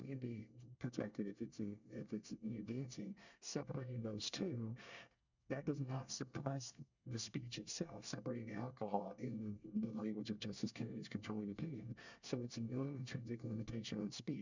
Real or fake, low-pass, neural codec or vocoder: fake; 7.2 kHz; codec, 24 kHz, 1 kbps, SNAC